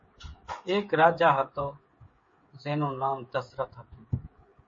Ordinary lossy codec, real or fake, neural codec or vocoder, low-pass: MP3, 32 kbps; fake; codec, 16 kHz, 8 kbps, FreqCodec, smaller model; 7.2 kHz